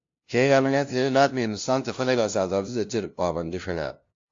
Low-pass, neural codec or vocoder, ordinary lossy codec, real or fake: 7.2 kHz; codec, 16 kHz, 0.5 kbps, FunCodec, trained on LibriTTS, 25 frames a second; AAC, 48 kbps; fake